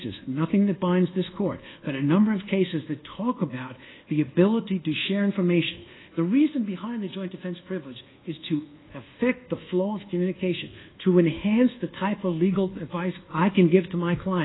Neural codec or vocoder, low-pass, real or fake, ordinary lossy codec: codec, 24 kHz, 1.2 kbps, DualCodec; 7.2 kHz; fake; AAC, 16 kbps